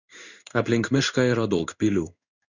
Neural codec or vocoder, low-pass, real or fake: codec, 16 kHz in and 24 kHz out, 1 kbps, XY-Tokenizer; 7.2 kHz; fake